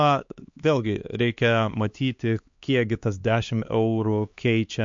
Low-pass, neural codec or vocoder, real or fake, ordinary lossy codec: 7.2 kHz; codec, 16 kHz, 2 kbps, X-Codec, HuBERT features, trained on LibriSpeech; fake; MP3, 48 kbps